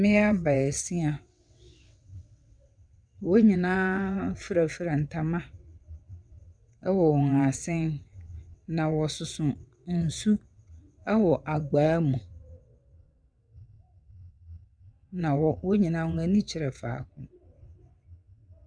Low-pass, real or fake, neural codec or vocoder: 9.9 kHz; fake; vocoder, 22.05 kHz, 80 mel bands, WaveNeXt